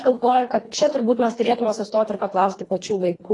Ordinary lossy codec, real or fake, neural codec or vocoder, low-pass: AAC, 32 kbps; fake; codec, 24 kHz, 1.5 kbps, HILCodec; 10.8 kHz